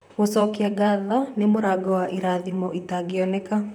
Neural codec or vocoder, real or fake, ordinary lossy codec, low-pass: vocoder, 44.1 kHz, 128 mel bands, Pupu-Vocoder; fake; none; 19.8 kHz